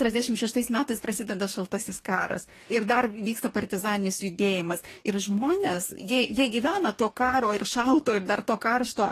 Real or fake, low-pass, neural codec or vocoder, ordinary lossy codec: fake; 14.4 kHz; codec, 44.1 kHz, 2.6 kbps, DAC; AAC, 48 kbps